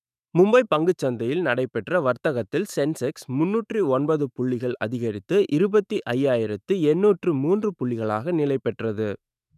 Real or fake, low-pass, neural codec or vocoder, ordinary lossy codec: fake; 14.4 kHz; autoencoder, 48 kHz, 128 numbers a frame, DAC-VAE, trained on Japanese speech; none